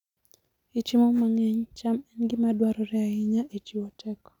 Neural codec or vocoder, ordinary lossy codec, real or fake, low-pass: none; none; real; 19.8 kHz